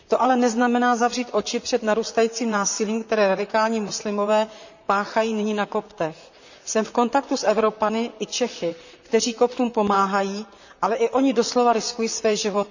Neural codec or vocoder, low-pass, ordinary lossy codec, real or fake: vocoder, 44.1 kHz, 128 mel bands, Pupu-Vocoder; 7.2 kHz; none; fake